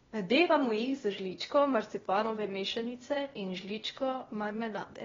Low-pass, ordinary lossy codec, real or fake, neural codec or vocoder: 7.2 kHz; AAC, 24 kbps; fake; codec, 16 kHz, 0.8 kbps, ZipCodec